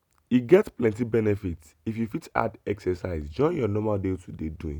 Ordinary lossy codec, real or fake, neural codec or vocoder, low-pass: none; real; none; 19.8 kHz